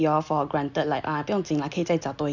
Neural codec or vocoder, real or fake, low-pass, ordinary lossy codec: none; real; 7.2 kHz; none